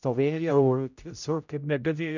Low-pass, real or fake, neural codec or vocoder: 7.2 kHz; fake; codec, 16 kHz, 0.5 kbps, X-Codec, HuBERT features, trained on general audio